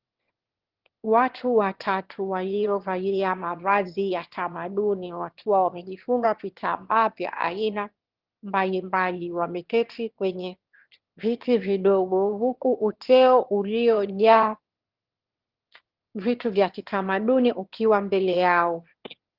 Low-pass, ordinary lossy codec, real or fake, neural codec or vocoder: 5.4 kHz; Opus, 16 kbps; fake; autoencoder, 22.05 kHz, a latent of 192 numbers a frame, VITS, trained on one speaker